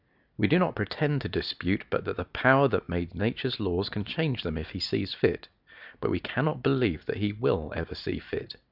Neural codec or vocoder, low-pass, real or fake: none; 5.4 kHz; real